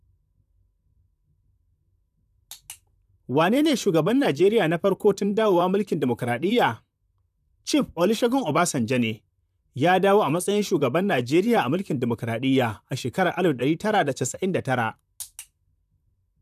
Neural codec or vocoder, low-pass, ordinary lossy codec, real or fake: vocoder, 44.1 kHz, 128 mel bands, Pupu-Vocoder; 14.4 kHz; none; fake